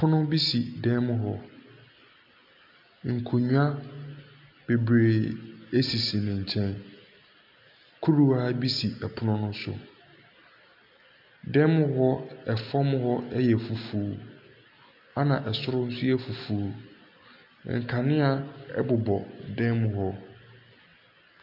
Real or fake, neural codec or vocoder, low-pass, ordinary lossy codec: real; none; 5.4 kHz; MP3, 48 kbps